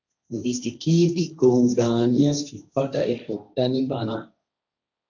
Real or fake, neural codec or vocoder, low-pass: fake; codec, 16 kHz, 1.1 kbps, Voila-Tokenizer; 7.2 kHz